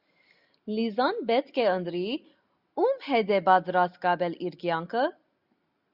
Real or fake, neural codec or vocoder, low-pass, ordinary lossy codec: real; none; 5.4 kHz; Opus, 64 kbps